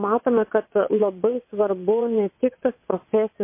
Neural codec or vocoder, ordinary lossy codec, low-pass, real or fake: vocoder, 22.05 kHz, 80 mel bands, WaveNeXt; MP3, 24 kbps; 3.6 kHz; fake